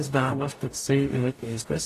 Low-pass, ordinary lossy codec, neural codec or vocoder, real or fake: 14.4 kHz; AAC, 48 kbps; codec, 44.1 kHz, 0.9 kbps, DAC; fake